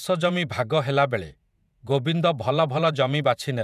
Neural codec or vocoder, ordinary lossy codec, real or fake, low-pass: vocoder, 48 kHz, 128 mel bands, Vocos; none; fake; 14.4 kHz